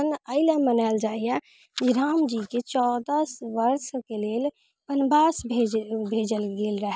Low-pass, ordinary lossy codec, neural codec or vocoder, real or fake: none; none; none; real